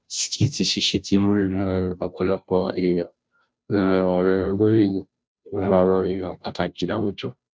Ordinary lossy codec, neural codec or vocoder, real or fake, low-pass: none; codec, 16 kHz, 0.5 kbps, FunCodec, trained on Chinese and English, 25 frames a second; fake; none